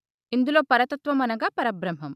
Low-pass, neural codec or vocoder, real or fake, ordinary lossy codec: 14.4 kHz; none; real; none